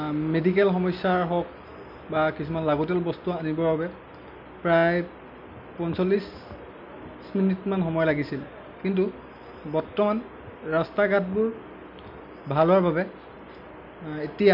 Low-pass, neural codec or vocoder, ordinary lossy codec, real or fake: 5.4 kHz; none; none; real